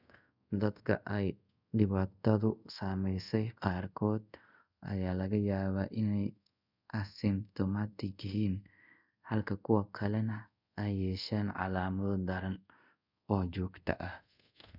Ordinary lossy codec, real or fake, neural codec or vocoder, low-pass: none; fake; codec, 24 kHz, 0.5 kbps, DualCodec; 5.4 kHz